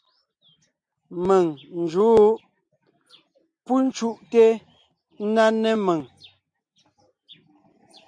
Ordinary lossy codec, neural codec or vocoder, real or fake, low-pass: MP3, 64 kbps; none; real; 9.9 kHz